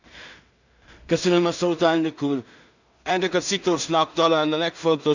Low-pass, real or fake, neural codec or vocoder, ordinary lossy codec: 7.2 kHz; fake; codec, 16 kHz in and 24 kHz out, 0.4 kbps, LongCat-Audio-Codec, two codebook decoder; none